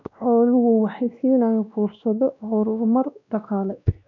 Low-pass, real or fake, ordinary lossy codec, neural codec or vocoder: 7.2 kHz; fake; none; codec, 16 kHz, 1 kbps, X-Codec, WavLM features, trained on Multilingual LibriSpeech